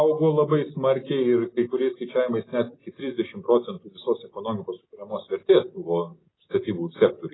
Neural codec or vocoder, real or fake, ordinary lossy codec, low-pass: none; real; AAC, 16 kbps; 7.2 kHz